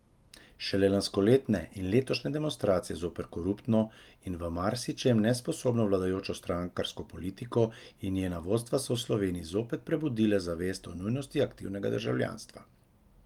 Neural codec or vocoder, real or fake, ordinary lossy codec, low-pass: none; real; Opus, 32 kbps; 19.8 kHz